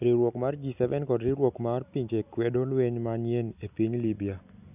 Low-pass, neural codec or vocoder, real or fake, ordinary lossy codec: 3.6 kHz; none; real; none